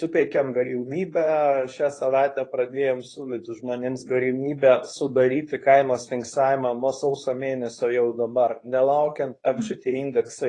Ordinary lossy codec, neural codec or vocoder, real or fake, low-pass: AAC, 32 kbps; codec, 24 kHz, 0.9 kbps, WavTokenizer, medium speech release version 2; fake; 10.8 kHz